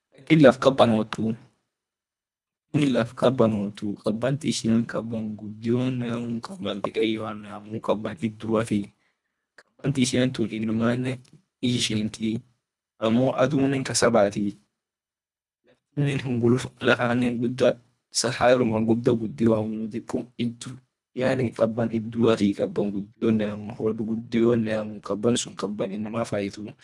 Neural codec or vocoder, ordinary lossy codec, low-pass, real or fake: codec, 24 kHz, 1.5 kbps, HILCodec; none; none; fake